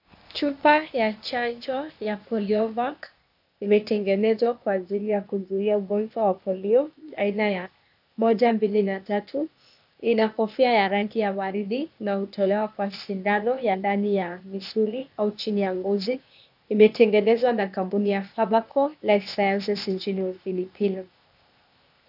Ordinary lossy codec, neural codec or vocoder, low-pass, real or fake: AAC, 48 kbps; codec, 16 kHz, 0.8 kbps, ZipCodec; 5.4 kHz; fake